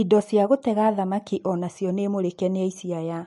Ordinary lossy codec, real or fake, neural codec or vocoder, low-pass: MP3, 48 kbps; real; none; 14.4 kHz